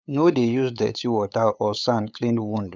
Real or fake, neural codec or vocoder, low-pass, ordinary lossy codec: fake; codec, 16 kHz, 8 kbps, FunCodec, trained on LibriTTS, 25 frames a second; none; none